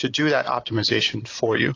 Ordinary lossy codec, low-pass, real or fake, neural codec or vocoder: AAC, 32 kbps; 7.2 kHz; real; none